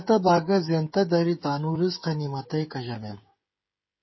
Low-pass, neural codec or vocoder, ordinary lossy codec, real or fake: 7.2 kHz; codec, 16 kHz, 16 kbps, FreqCodec, smaller model; MP3, 24 kbps; fake